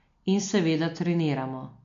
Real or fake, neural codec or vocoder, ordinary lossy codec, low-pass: real; none; MP3, 48 kbps; 7.2 kHz